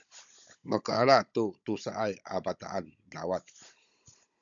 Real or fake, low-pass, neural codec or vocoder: fake; 7.2 kHz; codec, 16 kHz, 16 kbps, FunCodec, trained on Chinese and English, 50 frames a second